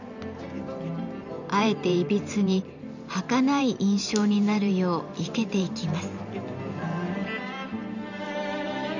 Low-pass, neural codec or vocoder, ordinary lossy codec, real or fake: 7.2 kHz; vocoder, 44.1 kHz, 128 mel bands every 512 samples, BigVGAN v2; none; fake